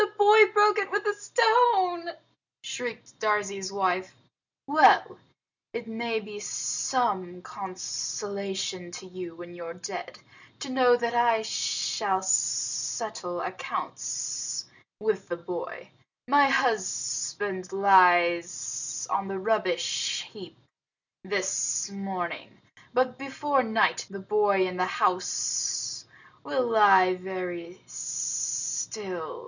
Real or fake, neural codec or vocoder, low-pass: real; none; 7.2 kHz